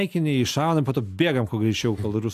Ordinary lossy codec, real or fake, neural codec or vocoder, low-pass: AAC, 96 kbps; real; none; 14.4 kHz